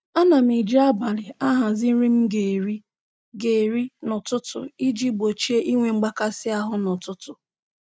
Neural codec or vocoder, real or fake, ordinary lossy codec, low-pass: none; real; none; none